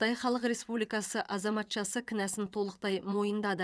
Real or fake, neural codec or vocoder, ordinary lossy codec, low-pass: fake; vocoder, 22.05 kHz, 80 mel bands, WaveNeXt; none; none